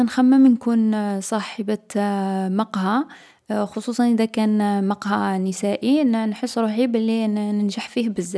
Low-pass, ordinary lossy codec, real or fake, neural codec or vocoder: none; none; real; none